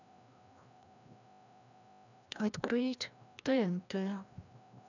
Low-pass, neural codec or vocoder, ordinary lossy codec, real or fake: 7.2 kHz; codec, 16 kHz, 1 kbps, FreqCodec, larger model; none; fake